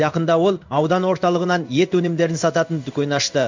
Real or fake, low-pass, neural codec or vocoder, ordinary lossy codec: fake; 7.2 kHz; codec, 16 kHz in and 24 kHz out, 1 kbps, XY-Tokenizer; MP3, 64 kbps